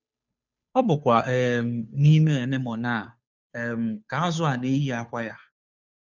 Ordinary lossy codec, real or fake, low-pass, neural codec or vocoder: none; fake; 7.2 kHz; codec, 16 kHz, 2 kbps, FunCodec, trained on Chinese and English, 25 frames a second